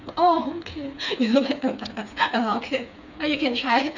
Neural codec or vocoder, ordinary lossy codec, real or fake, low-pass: codec, 16 kHz, 4 kbps, FreqCodec, smaller model; none; fake; 7.2 kHz